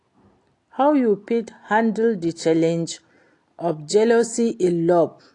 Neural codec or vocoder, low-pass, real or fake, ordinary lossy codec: none; 10.8 kHz; real; AAC, 48 kbps